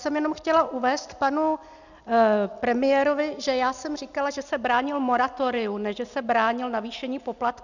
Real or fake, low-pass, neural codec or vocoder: real; 7.2 kHz; none